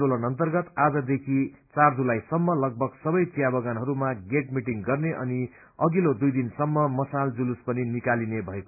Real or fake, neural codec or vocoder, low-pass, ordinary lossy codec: real; none; 3.6 kHz; MP3, 32 kbps